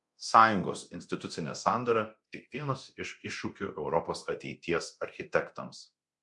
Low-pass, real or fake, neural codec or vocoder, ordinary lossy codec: 10.8 kHz; fake; codec, 24 kHz, 0.9 kbps, DualCodec; MP3, 64 kbps